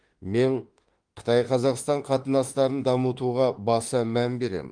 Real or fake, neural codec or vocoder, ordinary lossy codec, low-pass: fake; autoencoder, 48 kHz, 32 numbers a frame, DAC-VAE, trained on Japanese speech; Opus, 24 kbps; 9.9 kHz